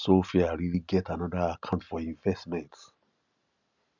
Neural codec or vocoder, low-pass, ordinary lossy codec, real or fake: none; 7.2 kHz; none; real